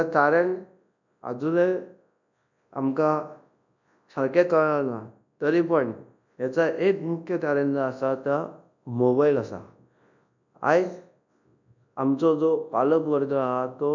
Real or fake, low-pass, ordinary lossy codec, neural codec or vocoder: fake; 7.2 kHz; none; codec, 24 kHz, 0.9 kbps, WavTokenizer, large speech release